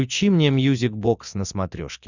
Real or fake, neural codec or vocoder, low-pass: real; none; 7.2 kHz